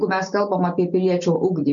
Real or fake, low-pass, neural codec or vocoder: real; 7.2 kHz; none